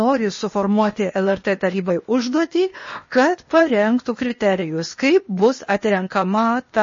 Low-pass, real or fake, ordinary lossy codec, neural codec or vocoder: 7.2 kHz; fake; MP3, 32 kbps; codec, 16 kHz, 0.8 kbps, ZipCodec